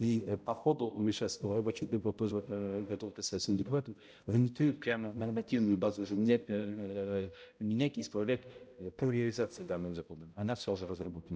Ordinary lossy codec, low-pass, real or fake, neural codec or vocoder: none; none; fake; codec, 16 kHz, 0.5 kbps, X-Codec, HuBERT features, trained on balanced general audio